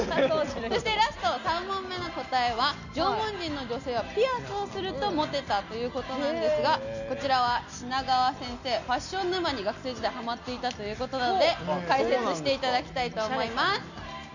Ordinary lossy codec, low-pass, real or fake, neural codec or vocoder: none; 7.2 kHz; real; none